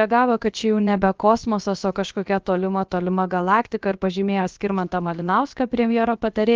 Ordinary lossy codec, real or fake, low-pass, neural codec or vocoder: Opus, 32 kbps; fake; 7.2 kHz; codec, 16 kHz, about 1 kbps, DyCAST, with the encoder's durations